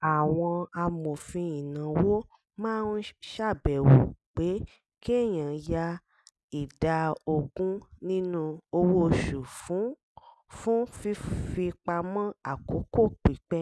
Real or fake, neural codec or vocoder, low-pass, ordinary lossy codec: real; none; none; none